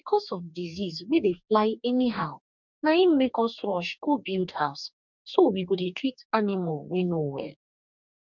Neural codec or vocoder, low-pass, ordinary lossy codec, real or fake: codec, 44.1 kHz, 2.6 kbps, DAC; 7.2 kHz; none; fake